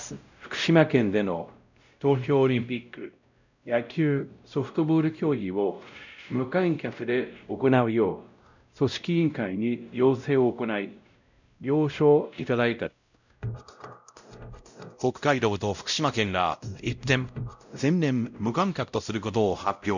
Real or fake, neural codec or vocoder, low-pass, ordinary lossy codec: fake; codec, 16 kHz, 0.5 kbps, X-Codec, WavLM features, trained on Multilingual LibriSpeech; 7.2 kHz; none